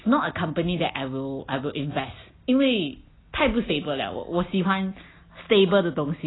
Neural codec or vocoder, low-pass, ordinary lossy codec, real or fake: none; 7.2 kHz; AAC, 16 kbps; real